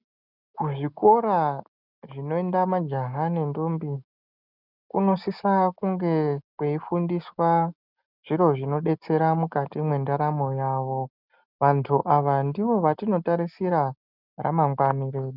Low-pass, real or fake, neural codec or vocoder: 5.4 kHz; real; none